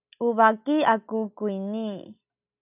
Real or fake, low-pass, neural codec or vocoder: real; 3.6 kHz; none